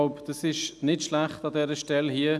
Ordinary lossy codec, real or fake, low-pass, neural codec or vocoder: none; real; none; none